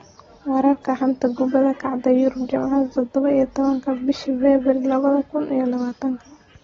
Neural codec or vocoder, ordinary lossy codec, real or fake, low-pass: none; AAC, 24 kbps; real; 7.2 kHz